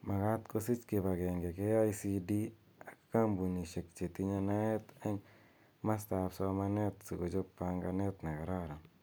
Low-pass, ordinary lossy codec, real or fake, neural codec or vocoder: none; none; real; none